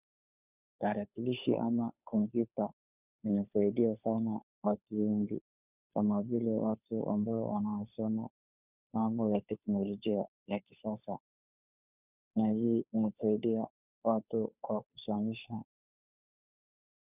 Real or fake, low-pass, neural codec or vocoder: fake; 3.6 kHz; codec, 16 kHz, 2 kbps, FunCodec, trained on Chinese and English, 25 frames a second